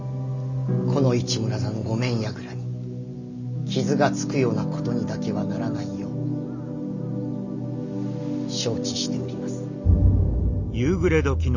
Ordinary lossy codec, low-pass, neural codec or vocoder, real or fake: none; 7.2 kHz; none; real